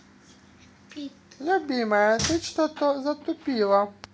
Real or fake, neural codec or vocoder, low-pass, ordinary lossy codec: real; none; none; none